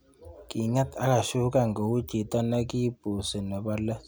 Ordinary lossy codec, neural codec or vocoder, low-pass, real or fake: none; none; none; real